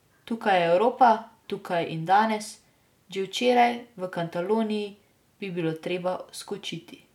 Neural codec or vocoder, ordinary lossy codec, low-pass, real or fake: none; none; 19.8 kHz; real